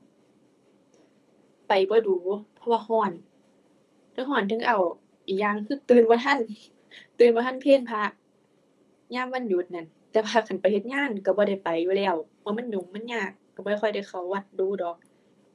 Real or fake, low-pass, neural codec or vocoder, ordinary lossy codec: fake; none; codec, 24 kHz, 6 kbps, HILCodec; none